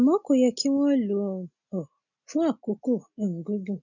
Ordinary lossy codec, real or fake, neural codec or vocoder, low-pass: none; real; none; 7.2 kHz